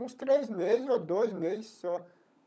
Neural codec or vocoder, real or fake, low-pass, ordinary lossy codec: codec, 16 kHz, 16 kbps, FunCodec, trained on LibriTTS, 50 frames a second; fake; none; none